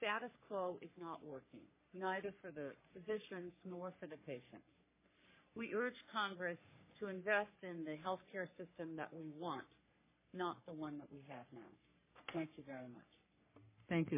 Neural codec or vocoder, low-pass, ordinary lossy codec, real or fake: codec, 44.1 kHz, 3.4 kbps, Pupu-Codec; 3.6 kHz; MP3, 16 kbps; fake